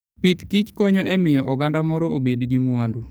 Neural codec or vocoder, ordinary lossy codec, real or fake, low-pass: codec, 44.1 kHz, 2.6 kbps, SNAC; none; fake; none